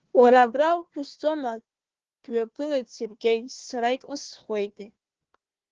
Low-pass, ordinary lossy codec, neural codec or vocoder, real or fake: 7.2 kHz; Opus, 16 kbps; codec, 16 kHz, 1 kbps, FunCodec, trained on Chinese and English, 50 frames a second; fake